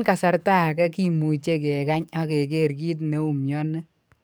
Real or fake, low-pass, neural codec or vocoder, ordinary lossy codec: fake; none; codec, 44.1 kHz, 7.8 kbps, DAC; none